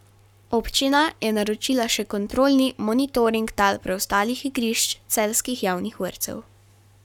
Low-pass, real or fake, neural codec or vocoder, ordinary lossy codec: 19.8 kHz; fake; codec, 44.1 kHz, 7.8 kbps, Pupu-Codec; none